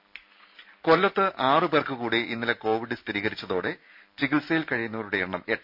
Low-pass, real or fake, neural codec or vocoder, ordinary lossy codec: 5.4 kHz; real; none; none